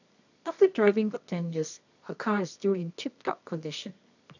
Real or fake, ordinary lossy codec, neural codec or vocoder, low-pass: fake; none; codec, 24 kHz, 0.9 kbps, WavTokenizer, medium music audio release; 7.2 kHz